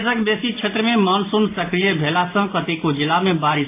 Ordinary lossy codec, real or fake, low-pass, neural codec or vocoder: AAC, 24 kbps; real; 3.6 kHz; none